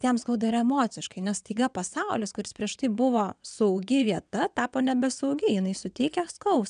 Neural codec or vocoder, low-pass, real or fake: vocoder, 22.05 kHz, 80 mel bands, WaveNeXt; 9.9 kHz; fake